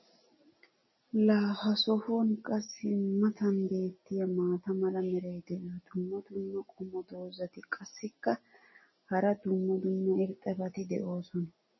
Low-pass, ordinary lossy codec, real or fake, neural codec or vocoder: 7.2 kHz; MP3, 24 kbps; real; none